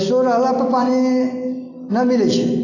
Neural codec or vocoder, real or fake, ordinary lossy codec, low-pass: none; real; AAC, 32 kbps; 7.2 kHz